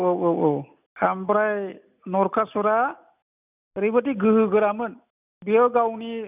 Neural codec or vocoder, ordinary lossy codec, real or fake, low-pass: none; none; real; 3.6 kHz